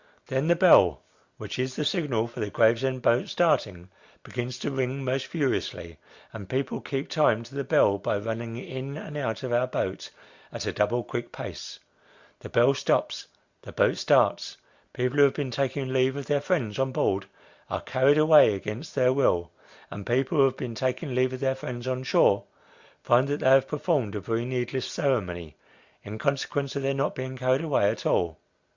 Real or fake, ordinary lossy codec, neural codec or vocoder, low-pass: real; Opus, 64 kbps; none; 7.2 kHz